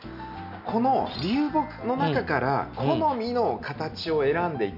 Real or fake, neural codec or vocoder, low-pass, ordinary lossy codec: real; none; 5.4 kHz; none